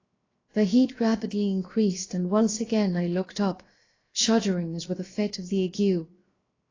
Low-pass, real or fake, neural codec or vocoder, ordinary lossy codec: 7.2 kHz; fake; codec, 16 kHz, 0.7 kbps, FocalCodec; AAC, 32 kbps